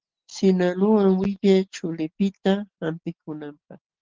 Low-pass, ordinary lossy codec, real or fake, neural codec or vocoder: 7.2 kHz; Opus, 16 kbps; real; none